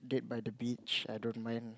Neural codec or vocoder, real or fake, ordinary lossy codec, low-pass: none; real; none; none